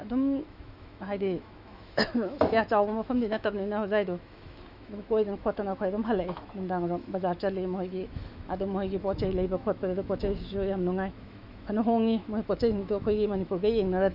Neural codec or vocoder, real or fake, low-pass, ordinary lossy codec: autoencoder, 48 kHz, 128 numbers a frame, DAC-VAE, trained on Japanese speech; fake; 5.4 kHz; none